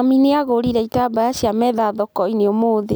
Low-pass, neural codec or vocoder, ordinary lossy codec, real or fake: none; none; none; real